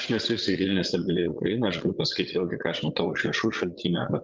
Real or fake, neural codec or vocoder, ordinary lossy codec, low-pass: fake; vocoder, 22.05 kHz, 80 mel bands, Vocos; Opus, 32 kbps; 7.2 kHz